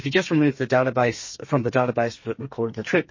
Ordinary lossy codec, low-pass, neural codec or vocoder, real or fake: MP3, 32 kbps; 7.2 kHz; codec, 32 kHz, 1.9 kbps, SNAC; fake